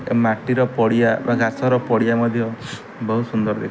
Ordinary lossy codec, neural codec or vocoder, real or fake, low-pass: none; none; real; none